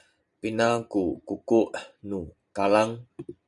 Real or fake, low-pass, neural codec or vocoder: fake; 10.8 kHz; vocoder, 44.1 kHz, 128 mel bands every 512 samples, BigVGAN v2